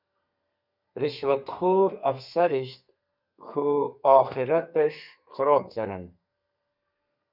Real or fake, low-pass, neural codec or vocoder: fake; 5.4 kHz; codec, 32 kHz, 1.9 kbps, SNAC